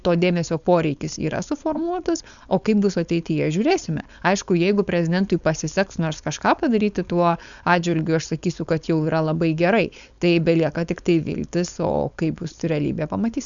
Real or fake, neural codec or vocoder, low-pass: fake; codec, 16 kHz, 4.8 kbps, FACodec; 7.2 kHz